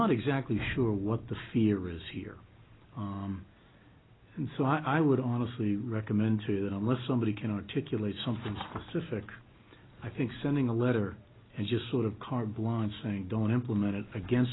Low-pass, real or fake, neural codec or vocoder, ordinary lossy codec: 7.2 kHz; real; none; AAC, 16 kbps